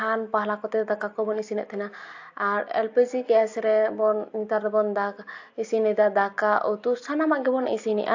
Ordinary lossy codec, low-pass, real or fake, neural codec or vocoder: AAC, 48 kbps; 7.2 kHz; real; none